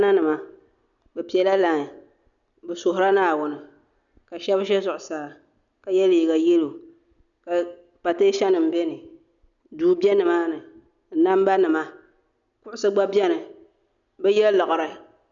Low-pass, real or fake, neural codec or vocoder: 7.2 kHz; real; none